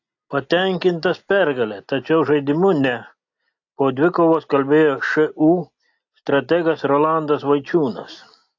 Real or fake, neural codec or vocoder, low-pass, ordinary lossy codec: real; none; 7.2 kHz; AAC, 48 kbps